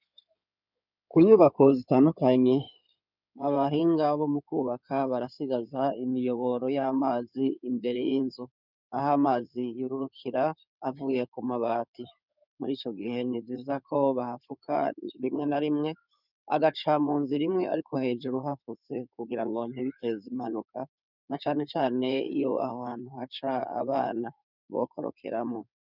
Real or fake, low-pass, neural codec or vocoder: fake; 5.4 kHz; codec, 16 kHz in and 24 kHz out, 2.2 kbps, FireRedTTS-2 codec